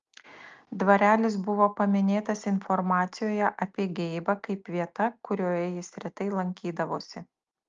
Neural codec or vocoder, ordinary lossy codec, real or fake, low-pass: none; Opus, 32 kbps; real; 7.2 kHz